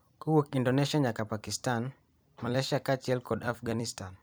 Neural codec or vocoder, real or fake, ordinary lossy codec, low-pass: vocoder, 44.1 kHz, 128 mel bands every 256 samples, BigVGAN v2; fake; none; none